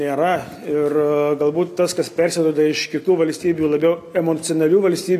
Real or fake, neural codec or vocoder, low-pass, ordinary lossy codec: real; none; 14.4 kHz; MP3, 96 kbps